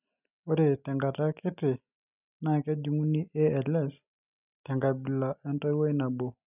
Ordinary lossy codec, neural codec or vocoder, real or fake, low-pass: none; none; real; 3.6 kHz